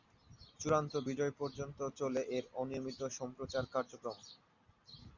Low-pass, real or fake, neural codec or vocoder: 7.2 kHz; real; none